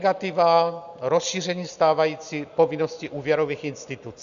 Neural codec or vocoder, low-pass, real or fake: none; 7.2 kHz; real